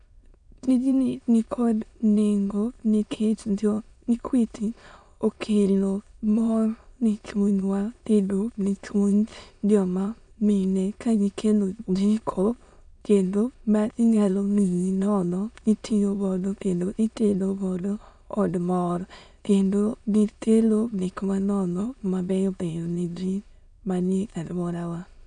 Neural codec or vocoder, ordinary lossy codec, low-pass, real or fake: autoencoder, 22.05 kHz, a latent of 192 numbers a frame, VITS, trained on many speakers; none; 9.9 kHz; fake